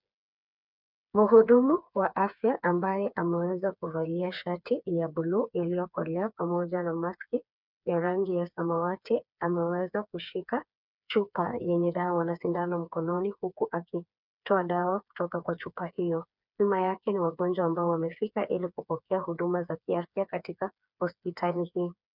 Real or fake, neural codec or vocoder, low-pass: fake; codec, 16 kHz, 4 kbps, FreqCodec, smaller model; 5.4 kHz